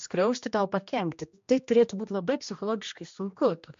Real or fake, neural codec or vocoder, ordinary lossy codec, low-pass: fake; codec, 16 kHz, 1 kbps, X-Codec, HuBERT features, trained on general audio; MP3, 48 kbps; 7.2 kHz